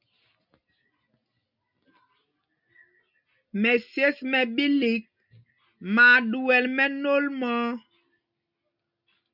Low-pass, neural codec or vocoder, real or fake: 5.4 kHz; none; real